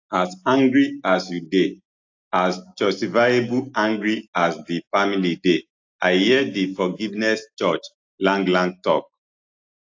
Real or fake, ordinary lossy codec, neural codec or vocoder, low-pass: real; AAC, 48 kbps; none; 7.2 kHz